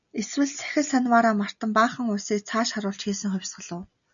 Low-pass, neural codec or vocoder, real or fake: 7.2 kHz; none; real